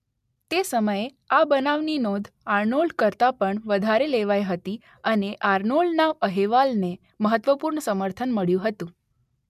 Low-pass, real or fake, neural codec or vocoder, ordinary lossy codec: 14.4 kHz; real; none; MP3, 96 kbps